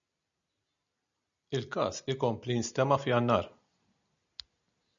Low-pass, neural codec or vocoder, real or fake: 7.2 kHz; none; real